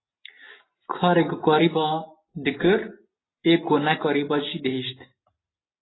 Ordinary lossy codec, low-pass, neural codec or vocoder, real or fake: AAC, 16 kbps; 7.2 kHz; none; real